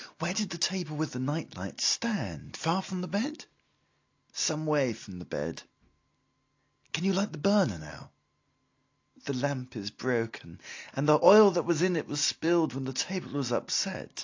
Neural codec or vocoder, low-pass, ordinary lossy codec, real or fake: none; 7.2 kHz; AAC, 48 kbps; real